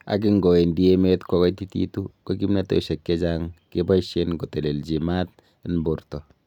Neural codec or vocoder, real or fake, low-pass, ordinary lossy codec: none; real; 19.8 kHz; none